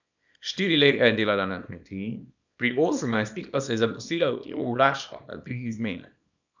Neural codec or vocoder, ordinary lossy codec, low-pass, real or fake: codec, 24 kHz, 0.9 kbps, WavTokenizer, small release; none; 7.2 kHz; fake